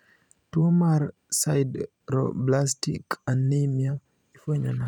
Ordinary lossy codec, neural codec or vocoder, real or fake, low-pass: none; vocoder, 44.1 kHz, 128 mel bands every 512 samples, BigVGAN v2; fake; 19.8 kHz